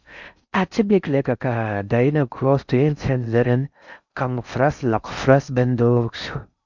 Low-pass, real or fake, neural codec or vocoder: 7.2 kHz; fake; codec, 16 kHz in and 24 kHz out, 0.6 kbps, FocalCodec, streaming, 4096 codes